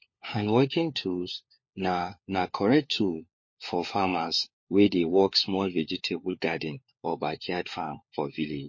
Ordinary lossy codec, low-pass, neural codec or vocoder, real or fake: MP3, 32 kbps; 7.2 kHz; codec, 16 kHz, 4 kbps, FunCodec, trained on LibriTTS, 50 frames a second; fake